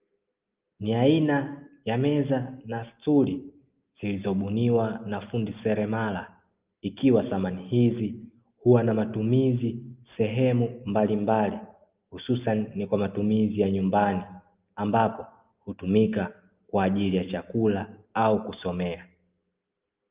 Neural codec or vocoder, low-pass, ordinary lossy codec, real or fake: none; 3.6 kHz; Opus, 32 kbps; real